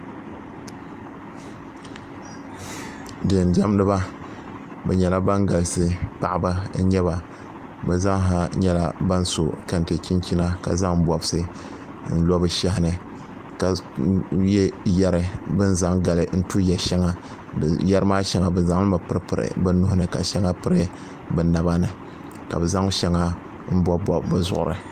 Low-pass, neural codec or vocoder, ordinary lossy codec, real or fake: 14.4 kHz; none; Opus, 32 kbps; real